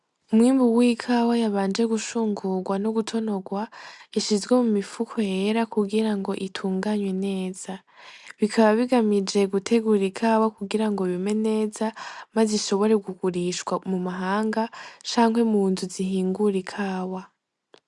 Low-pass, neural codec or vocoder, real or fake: 10.8 kHz; none; real